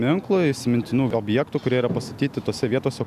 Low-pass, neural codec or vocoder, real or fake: 14.4 kHz; none; real